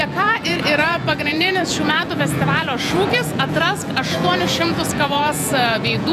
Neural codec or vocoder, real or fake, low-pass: vocoder, 48 kHz, 128 mel bands, Vocos; fake; 14.4 kHz